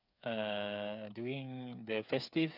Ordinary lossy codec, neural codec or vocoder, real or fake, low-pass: none; codec, 16 kHz, 8 kbps, FreqCodec, smaller model; fake; 5.4 kHz